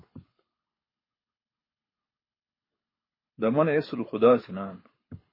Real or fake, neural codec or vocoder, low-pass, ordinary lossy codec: fake; codec, 24 kHz, 6 kbps, HILCodec; 5.4 kHz; MP3, 24 kbps